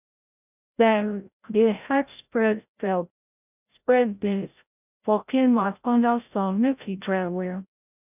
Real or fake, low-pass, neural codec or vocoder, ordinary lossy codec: fake; 3.6 kHz; codec, 16 kHz, 0.5 kbps, FreqCodec, larger model; none